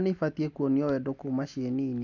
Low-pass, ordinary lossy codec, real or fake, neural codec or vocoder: 7.2 kHz; none; real; none